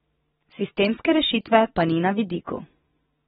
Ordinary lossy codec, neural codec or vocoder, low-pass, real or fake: AAC, 16 kbps; none; 19.8 kHz; real